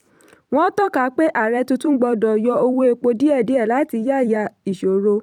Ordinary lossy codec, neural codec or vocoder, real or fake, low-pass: none; vocoder, 44.1 kHz, 128 mel bands every 256 samples, BigVGAN v2; fake; 19.8 kHz